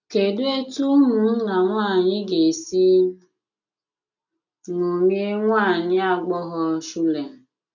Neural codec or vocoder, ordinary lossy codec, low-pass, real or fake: none; none; 7.2 kHz; real